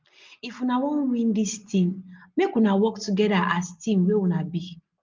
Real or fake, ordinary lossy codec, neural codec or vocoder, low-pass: real; Opus, 24 kbps; none; 7.2 kHz